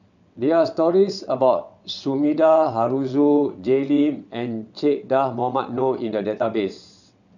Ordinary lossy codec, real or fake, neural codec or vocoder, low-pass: none; fake; vocoder, 22.05 kHz, 80 mel bands, WaveNeXt; 7.2 kHz